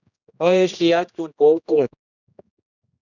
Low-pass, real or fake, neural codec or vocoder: 7.2 kHz; fake; codec, 16 kHz, 1 kbps, X-Codec, HuBERT features, trained on general audio